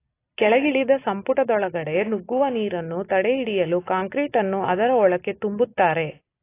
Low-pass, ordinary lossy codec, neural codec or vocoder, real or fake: 3.6 kHz; AAC, 24 kbps; none; real